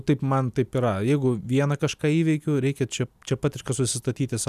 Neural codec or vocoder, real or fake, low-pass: none; real; 14.4 kHz